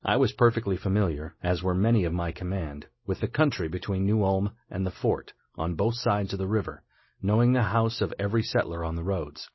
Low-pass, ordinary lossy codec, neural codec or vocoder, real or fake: 7.2 kHz; MP3, 24 kbps; none; real